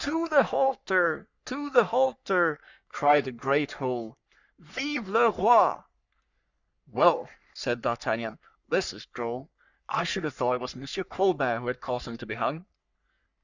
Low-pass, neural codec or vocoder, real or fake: 7.2 kHz; codec, 44.1 kHz, 3.4 kbps, Pupu-Codec; fake